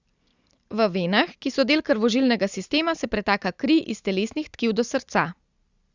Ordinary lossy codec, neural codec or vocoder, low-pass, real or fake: Opus, 64 kbps; none; 7.2 kHz; real